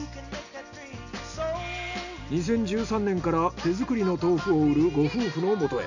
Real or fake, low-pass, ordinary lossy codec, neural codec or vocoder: real; 7.2 kHz; none; none